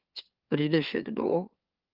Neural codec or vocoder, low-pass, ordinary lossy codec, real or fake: autoencoder, 44.1 kHz, a latent of 192 numbers a frame, MeloTTS; 5.4 kHz; Opus, 32 kbps; fake